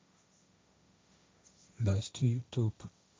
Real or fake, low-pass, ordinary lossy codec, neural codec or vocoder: fake; none; none; codec, 16 kHz, 1.1 kbps, Voila-Tokenizer